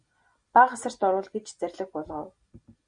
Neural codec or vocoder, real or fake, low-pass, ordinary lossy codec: none; real; 9.9 kHz; Opus, 64 kbps